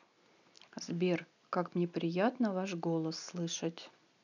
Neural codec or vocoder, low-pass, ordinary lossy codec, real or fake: none; 7.2 kHz; none; real